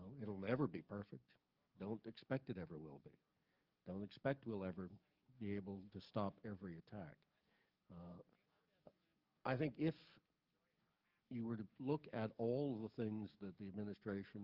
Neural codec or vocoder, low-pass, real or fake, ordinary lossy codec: codec, 44.1 kHz, 7.8 kbps, DAC; 5.4 kHz; fake; Opus, 24 kbps